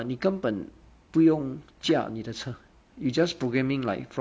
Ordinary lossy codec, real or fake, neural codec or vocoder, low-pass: none; real; none; none